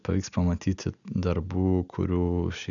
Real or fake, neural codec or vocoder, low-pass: real; none; 7.2 kHz